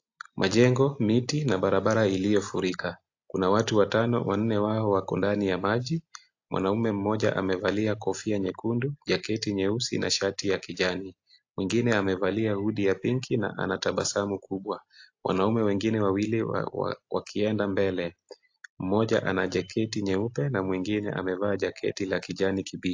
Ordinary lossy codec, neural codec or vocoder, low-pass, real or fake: AAC, 48 kbps; none; 7.2 kHz; real